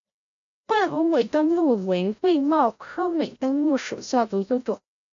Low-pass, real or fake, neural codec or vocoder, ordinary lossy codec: 7.2 kHz; fake; codec, 16 kHz, 0.5 kbps, FreqCodec, larger model; MP3, 64 kbps